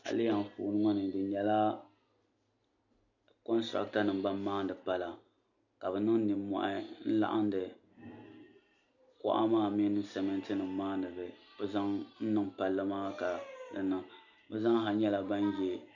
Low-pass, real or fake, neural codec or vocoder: 7.2 kHz; real; none